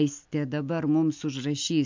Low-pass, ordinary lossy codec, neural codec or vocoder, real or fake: 7.2 kHz; MP3, 64 kbps; none; real